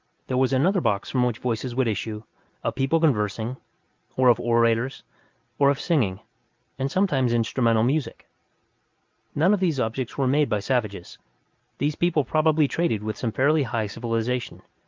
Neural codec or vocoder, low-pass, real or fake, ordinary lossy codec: none; 7.2 kHz; real; Opus, 24 kbps